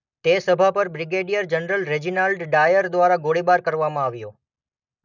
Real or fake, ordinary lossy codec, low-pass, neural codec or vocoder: real; none; 7.2 kHz; none